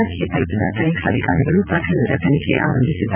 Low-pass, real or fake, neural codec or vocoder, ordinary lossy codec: 3.6 kHz; fake; vocoder, 44.1 kHz, 80 mel bands, Vocos; none